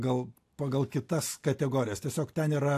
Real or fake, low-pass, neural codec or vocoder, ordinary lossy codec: real; 14.4 kHz; none; AAC, 64 kbps